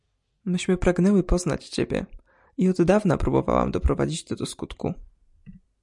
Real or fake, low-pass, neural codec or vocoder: real; 10.8 kHz; none